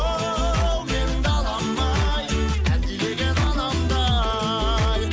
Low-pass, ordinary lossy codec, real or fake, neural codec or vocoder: none; none; real; none